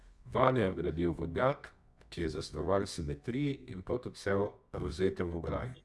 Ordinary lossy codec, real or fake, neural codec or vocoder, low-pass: none; fake; codec, 24 kHz, 0.9 kbps, WavTokenizer, medium music audio release; none